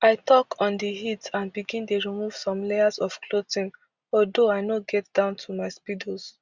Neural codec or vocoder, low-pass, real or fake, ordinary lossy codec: none; 7.2 kHz; real; Opus, 64 kbps